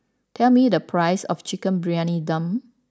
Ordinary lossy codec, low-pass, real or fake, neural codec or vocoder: none; none; real; none